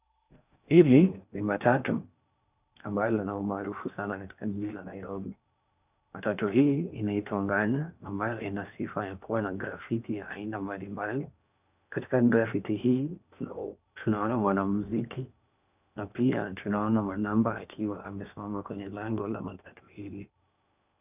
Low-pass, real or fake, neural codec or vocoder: 3.6 kHz; fake; codec, 16 kHz in and 24 kHz out, 0.8 kbps, FocalCodec, streaming, 65536 codes